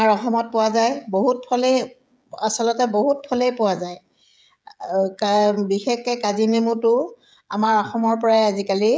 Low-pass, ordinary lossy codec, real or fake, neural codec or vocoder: none; none; fake; codec, 16 kHz, 16 kbps, FreqCodec, smaller model